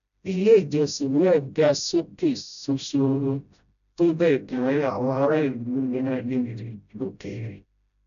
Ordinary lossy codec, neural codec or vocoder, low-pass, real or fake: none; codec, 16 kHz, 0.5 kbps, FreqCodec, smaller model; 7.2 kHz; fake